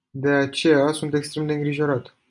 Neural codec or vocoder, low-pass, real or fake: none; 10.8 kHz; real